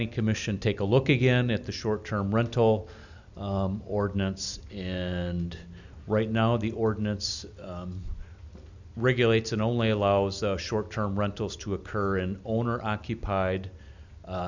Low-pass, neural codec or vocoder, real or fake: 7.2 kHz; none; real